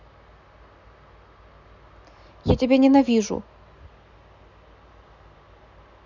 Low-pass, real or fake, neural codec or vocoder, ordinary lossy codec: 7.2 kHz; real; none; none